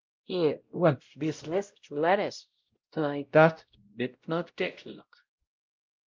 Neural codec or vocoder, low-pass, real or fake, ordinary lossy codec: codec, 16 kHz, 0.5 kbps, X-Codec, WavLM features, trained on Multilingual LibriSpeech; 7.2 kHz; fake; Opus, 24 kbps